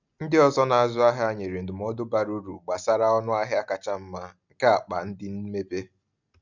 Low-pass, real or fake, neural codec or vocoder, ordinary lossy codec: 7.2 kHz; real; none; none